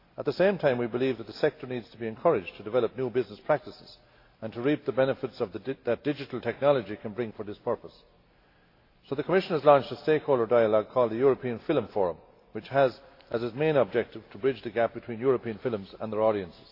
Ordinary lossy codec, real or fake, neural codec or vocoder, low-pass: AAC, 32 kbps; real; none; 5.4 kHz